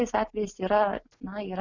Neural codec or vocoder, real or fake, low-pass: none; real; 7.2 kHz